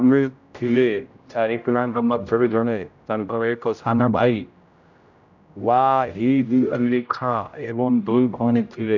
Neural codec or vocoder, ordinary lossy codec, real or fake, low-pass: codec, 16 kHz, 0.5 kbps, X-Codec, HuBERT features, trained on general audio; none; fake; 7.2 kHz